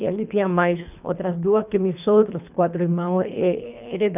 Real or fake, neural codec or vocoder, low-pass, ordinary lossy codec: fake; codec, 24 kHz, 3 kbps, HILCodec; 3.6 kHz; none